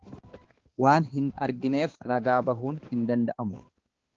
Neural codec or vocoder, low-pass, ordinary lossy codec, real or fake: codec, 16 kHz, 2 kbps, X-Codec, HuBERT features, trained on balanced general audio; 7.2 kHz; Opus, 16 kbps; fake